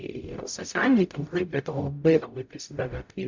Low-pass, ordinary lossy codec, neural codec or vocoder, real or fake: 7.2 kHz; MP3, 64 kbps; codec, 44.1 kHz, 0.9 kbps, DAC; fake